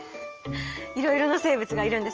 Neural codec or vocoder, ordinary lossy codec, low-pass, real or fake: none; Opus, 24 kbps; 7.2 kHz; real